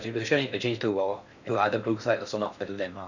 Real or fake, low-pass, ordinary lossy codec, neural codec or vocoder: fake; 7.2 kHz; none; codec, 16 kHz in and 24 kHz out, 0.6 kbps, FocalCodec, streaming, 4096 codes